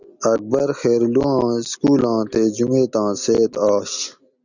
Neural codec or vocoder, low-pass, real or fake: none; 7.2 kHz; real